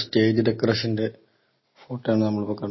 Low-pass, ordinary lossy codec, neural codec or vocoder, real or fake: 7.2 kHz; MP3, 24 kbps; none; real